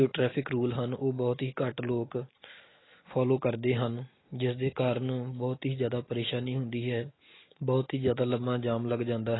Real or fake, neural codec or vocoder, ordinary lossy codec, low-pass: real; none; AAC, 16 kbps; 7.2 kHz